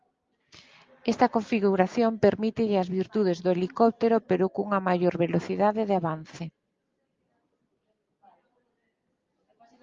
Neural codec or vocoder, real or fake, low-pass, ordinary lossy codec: none; real; 7.2 kHz; Opus, 32 kbps